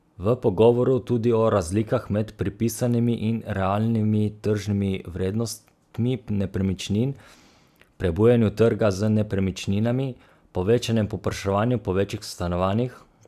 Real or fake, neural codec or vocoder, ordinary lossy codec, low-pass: real; none; none; 14.4 kHz